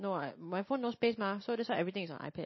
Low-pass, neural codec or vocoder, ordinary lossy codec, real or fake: 7.2 kHz; none; MP3, 24 kbps; real